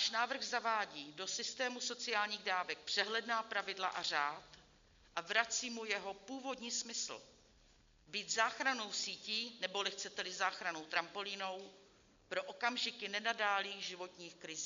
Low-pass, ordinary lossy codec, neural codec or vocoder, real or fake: 7.2 kHz; MP3, 64 kbps; none; real